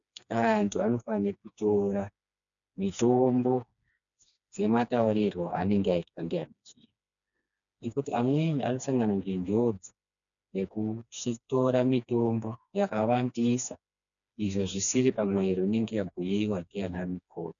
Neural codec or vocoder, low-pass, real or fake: codec, 16 kHz, 2 kbps, FreqCodec, smaller model; 7.2 kHz; fake